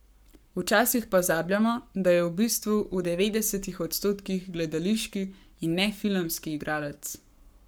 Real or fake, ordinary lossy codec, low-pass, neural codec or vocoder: fake; none; none; codec, 44.1 kHz, 7.8 kbps, Pupu-Codec